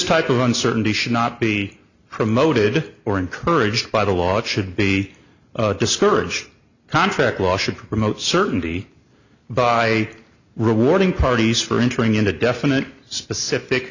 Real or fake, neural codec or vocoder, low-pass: real; none; 7.2 kHz